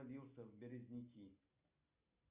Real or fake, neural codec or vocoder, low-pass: real; none; 3.6 kHz